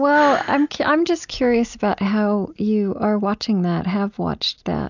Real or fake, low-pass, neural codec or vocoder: real; 7.2 kHz; none